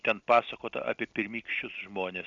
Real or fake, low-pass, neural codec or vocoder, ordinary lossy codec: real; 7.2 kHz; none; Opus, 64 kbps